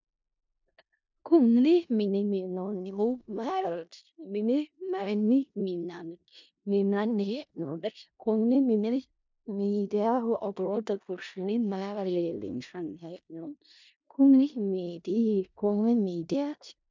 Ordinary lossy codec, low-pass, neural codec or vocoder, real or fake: MP3, 64 kbps; 7.2 kHz; codec, 16 kHz in and 24 kHz out, 0.4 kbps, LongCat-Audio-Codec, four codebook decoder; fake